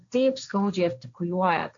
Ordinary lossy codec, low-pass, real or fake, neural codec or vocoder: AAC, 64 kbps; 7.2 kHz; fake; codec, 16 kHz, 1.1 kbps, Voila-Tokenizer